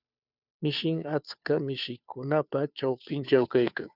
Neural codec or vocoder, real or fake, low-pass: codec, 16 kHz, 2 kbps, FunCodec, trained on Chinese and English, 25 frames a second; fake; 5.4 kHz